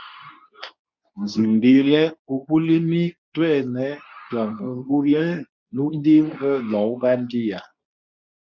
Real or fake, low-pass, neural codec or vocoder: fake; 7.2 kHz; codec, 24 kHz, 0.9 kbps, WavTokenizer, medium speech release version 2